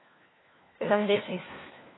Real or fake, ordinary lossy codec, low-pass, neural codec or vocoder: fake; AAC, 16 kbps; 7.2 kHz; codec, 16 kHz, 1 kbps, FunCodec, trained on LibriTTS, 50 frames a second